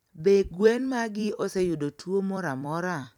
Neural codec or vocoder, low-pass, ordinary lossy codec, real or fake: vocoder, 44.1 kHz, 128 mel bands every 256 samples, BigVGAN v2; 19.8 kHz; none; fake